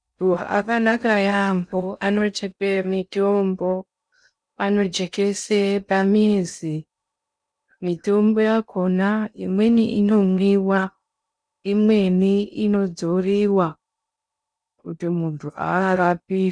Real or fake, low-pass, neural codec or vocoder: fake; 9.9 kHz; codec, 16 kHz in and 24 kHz out, 0.6 kbps, FocalCodec, streaming, 2048 codes